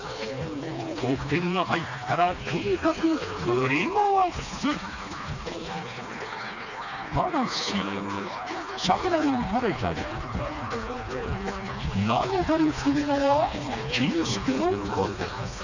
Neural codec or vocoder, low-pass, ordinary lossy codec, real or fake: codec, 16 kHz, 2 kbps, FreqCodec, smaller model; 7.2 kHz; none; fake